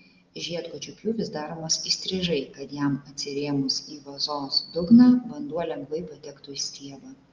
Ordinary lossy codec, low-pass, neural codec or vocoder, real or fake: Opus, 32 kbps; 7.2 kHz; none; real